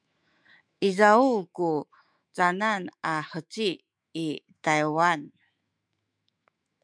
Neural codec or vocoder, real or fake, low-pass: autoencoder, 48 kHz, 128 numbers a frame, DAC-VAE, trained on Japanese speech; fake; 9.9 kHz